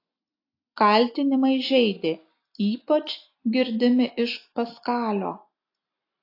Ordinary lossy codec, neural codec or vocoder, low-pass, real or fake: AAC, 32 kbps; none; 5.4 kHz; real